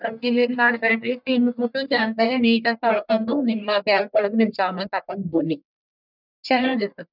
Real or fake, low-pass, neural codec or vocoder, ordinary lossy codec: fake; 5.4 kHz; codec, 44.1 kHz, 1.7 kbps, Pupu-Codec; none